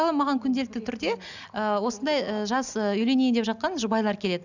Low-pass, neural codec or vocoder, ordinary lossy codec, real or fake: 7.2 kHz; none; none; real